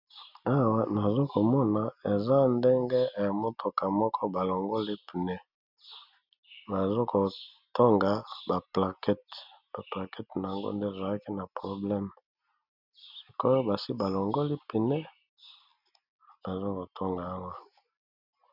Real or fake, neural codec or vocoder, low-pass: real; none; 5.4 kHz